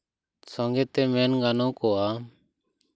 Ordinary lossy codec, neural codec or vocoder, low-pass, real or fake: none; none; none; real